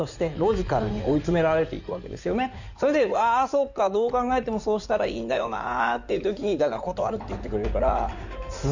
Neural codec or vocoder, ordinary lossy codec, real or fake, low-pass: codec, 16 kHz in and 24 kHz out, 2.2 kbps, FireRedTTS-2 codec; none; fake; 7.2 kHz